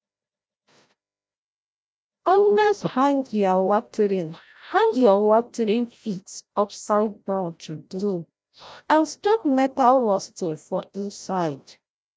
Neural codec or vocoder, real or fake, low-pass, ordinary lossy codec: codec, 16 kHz, 0.5 kbps, FreqCodec, larger model; fake; none; none